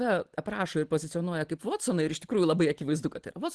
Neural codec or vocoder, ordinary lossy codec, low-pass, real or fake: none; Opus, 16 kbps; 10.8 kHz; real